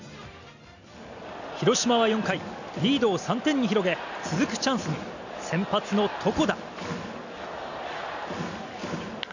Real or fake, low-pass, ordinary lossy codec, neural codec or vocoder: real; 7.2 kHz; none; none